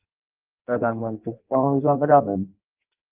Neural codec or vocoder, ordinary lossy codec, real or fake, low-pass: codec, 16 kHz in and 24 kHz out, 0.6 kbps, FireRedTTS-2 codec; Opus, 32 kbps; fake; 3.6 kHz